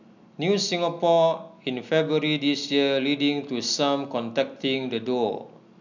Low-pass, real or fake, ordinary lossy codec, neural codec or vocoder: 7.2 kHz; real; none; none